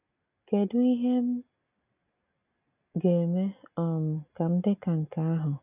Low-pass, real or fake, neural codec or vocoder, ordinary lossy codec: 3.6 kHz; real; none; none